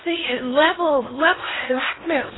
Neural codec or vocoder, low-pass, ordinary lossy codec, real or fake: codec, 16 kHz in and 24 kHz out, 0.6 kbps, FocalCodec, streaming, 4096 codes; 7.2 kHz; AAC, 16 kbps; fake